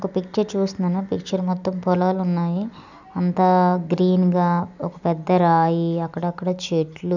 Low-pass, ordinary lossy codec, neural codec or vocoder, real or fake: 7.2 kHz; none; none; real